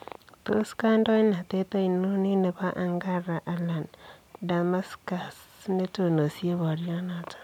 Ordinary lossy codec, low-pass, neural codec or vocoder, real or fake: none; 19.8 kHz; none; real